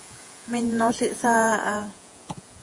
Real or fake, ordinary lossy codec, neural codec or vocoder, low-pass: fake; AAC, 48 kbps; vocoder, 48 kHz, 128 mel bands, Vocos; 10.8 kHz